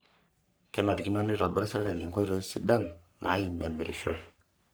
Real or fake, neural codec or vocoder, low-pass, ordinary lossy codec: fake; codec, 44.1 kHz, 3.4 kbps, Pupu-Codec; none; none